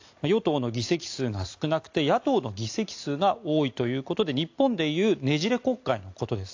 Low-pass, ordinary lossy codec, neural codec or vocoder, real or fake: 7.2 kHz; AAC, 48 kbps; none; real